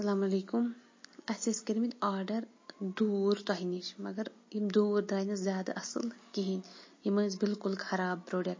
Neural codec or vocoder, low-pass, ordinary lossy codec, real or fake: none; 7.2 kHz; MP3, 32 kbps; real